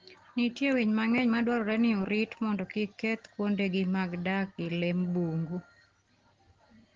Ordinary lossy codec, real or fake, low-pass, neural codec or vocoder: Opus, 16 kbps; real; 7.2 kHz; none